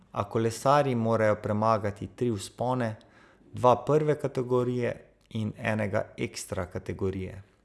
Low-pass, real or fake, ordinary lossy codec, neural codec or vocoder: none; real; none; none